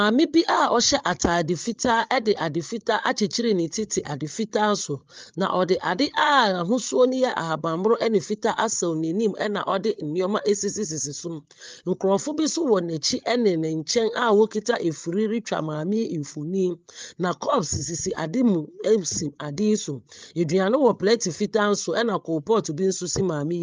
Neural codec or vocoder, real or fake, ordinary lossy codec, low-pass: codec, 16 kHz, 8 kbps, FreqCodec, larger model; fake; Opus, 24 kbps; 7.2 kHz